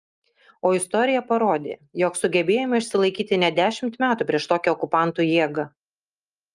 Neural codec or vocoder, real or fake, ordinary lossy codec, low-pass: none; real; Opus, 32 kbps; 10.8 kHz